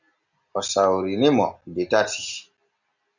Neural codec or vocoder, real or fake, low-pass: none; real; 7.2 kHz